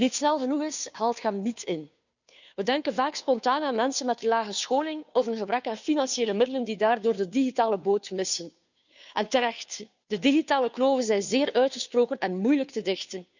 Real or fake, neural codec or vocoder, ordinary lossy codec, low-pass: fake; codec, 16 kHz, 2 kbps, FunCodec, trained on Chinese and English, 25 frames a second; none; 7.2 kHz